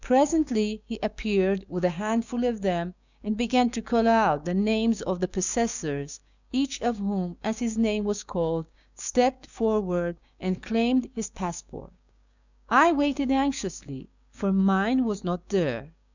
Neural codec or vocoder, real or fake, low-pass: codec, 16 kHz, 6 kbps, DAC; fake; 7.2 kHz